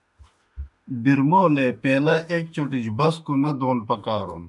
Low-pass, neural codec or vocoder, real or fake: 10.8 kHz; autoencoder, 48 kHz, 32 numbers a frame, DAC-VAE, trained on Japanese speech; fake